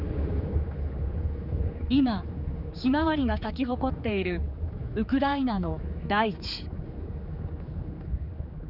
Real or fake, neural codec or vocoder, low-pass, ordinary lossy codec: fake; codec, 16 kHz, 4 kbps, X-Codec, HuBERT features, trained on general audio; 5.4 kHz; none